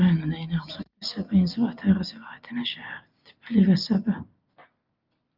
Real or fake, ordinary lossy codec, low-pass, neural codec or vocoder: real; Opus, 24 kbps; 5.4 kHz; none